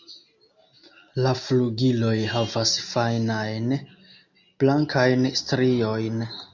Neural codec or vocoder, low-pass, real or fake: none; 7.2 kHz; real